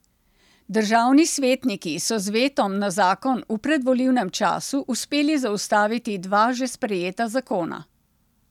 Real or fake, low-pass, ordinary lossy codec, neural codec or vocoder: real; 19.8 kHz; none; none